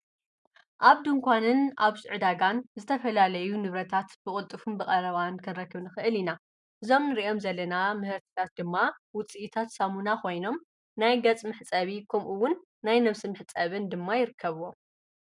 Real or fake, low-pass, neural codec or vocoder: real; 10.8 kHz; none